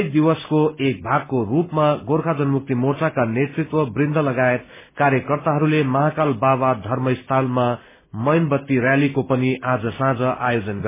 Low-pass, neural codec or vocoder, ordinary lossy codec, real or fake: 3.6 kHz; none; MP3, 16 kbps; real